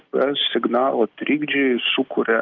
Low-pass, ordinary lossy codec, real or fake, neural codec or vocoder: 7.2 kHz; Opus, 24 kbps; real; none